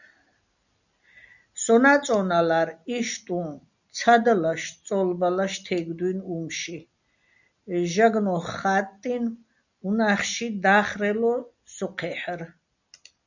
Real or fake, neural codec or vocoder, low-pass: real; none; 7.2 kHz